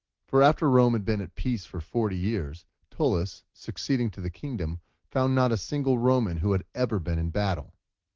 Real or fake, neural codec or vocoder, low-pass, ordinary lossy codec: real; none; 7.2 kHz; Opus, 16 kbps